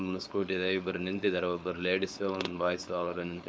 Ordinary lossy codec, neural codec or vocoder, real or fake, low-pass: none; codec, 16 kHz, 4.8 kbps, FACodec; fake; none